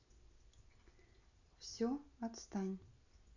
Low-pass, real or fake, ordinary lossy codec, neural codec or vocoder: 7.2 kHz; real; none; none